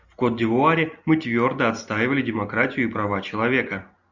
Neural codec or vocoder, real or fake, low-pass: none; real; 7.2 kHz